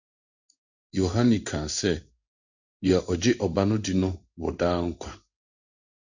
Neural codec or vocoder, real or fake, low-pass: codec, 16 kHz in and 24 kHz out, 1 kbps, XY-Tokenizer; fake; 7.2 kHz